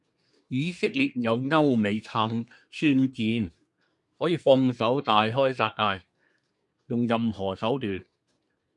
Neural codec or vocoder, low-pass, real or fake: codec, 24 kHz, 1 kbps, SNAC; 10.8 kHz; fake